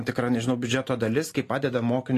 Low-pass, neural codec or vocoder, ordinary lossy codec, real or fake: 14.4 kHz; none; AAC, 48 kbps; real